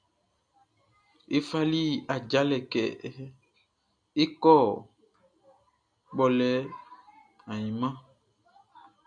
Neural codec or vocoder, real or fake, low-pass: none; real; 9.9 kHz